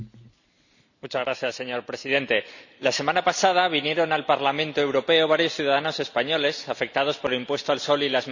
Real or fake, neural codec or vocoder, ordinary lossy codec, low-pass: real; none; none; 7.2 kHz